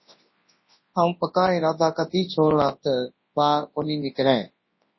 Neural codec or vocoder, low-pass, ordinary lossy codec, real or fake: codec, 24 kHz, 0.9 kbps, WavTokenizer, large speech release; 7.2 kHz; MP3, 24 kbps; fake